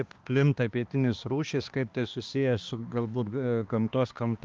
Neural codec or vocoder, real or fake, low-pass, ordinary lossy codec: codec, 16 kHz, 2 kbps, X-Codec, HuBERT features, trained on balanced general audio; fake; 7.2 kHz; Opus, 32 kbps